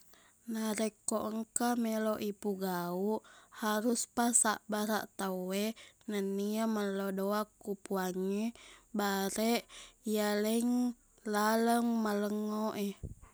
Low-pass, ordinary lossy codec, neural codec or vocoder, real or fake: none; none; none; real